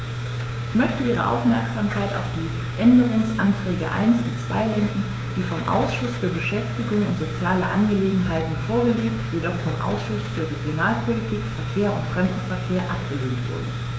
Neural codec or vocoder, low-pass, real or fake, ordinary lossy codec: codec, 16 kHz, 6 kbps, DAC; none; fake; none